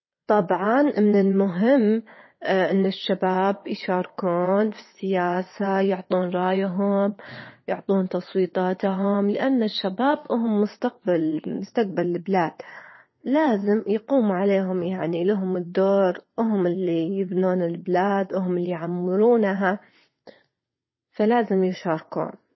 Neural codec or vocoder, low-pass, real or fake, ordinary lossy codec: vocoder, 22.05 kHz, 80 mel bands, WaveNeXt; 7.2 kHz; fake; MP3, 24 kbps